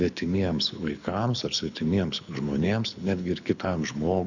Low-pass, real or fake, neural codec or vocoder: 7.2 kHz; fake; codec, 24 kHz, 6 kbps, HILCodec